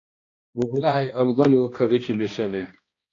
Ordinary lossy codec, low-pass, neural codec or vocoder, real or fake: AAC, 32 kbps; 7.2 kHz; codec, 16 kHz, 1 kbps, X-Codec, HuBERT features, trained on balanced general audio; fake